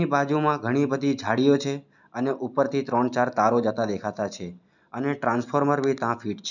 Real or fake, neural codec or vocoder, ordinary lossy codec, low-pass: real; none; none; 7.2 kHz